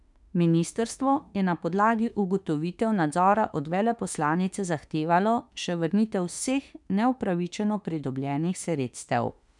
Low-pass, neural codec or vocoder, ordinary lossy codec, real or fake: 10.8 kHz; autoencoder, 48 kHz, 32 numbers a frame, DAC-VAE, trained on Japanese speech; none; fake